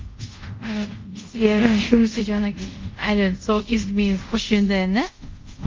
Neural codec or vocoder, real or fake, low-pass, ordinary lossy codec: codec, 24 kHz, 0.5 kbps, DualCodec; fake; 7.2 kHz; Opus, 32 kbps